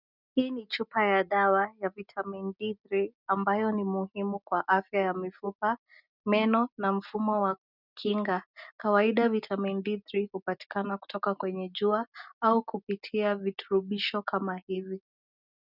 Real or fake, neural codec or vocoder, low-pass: real; none; 5.4 kHz